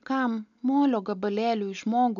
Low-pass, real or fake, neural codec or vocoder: 7.2 kHz; real; none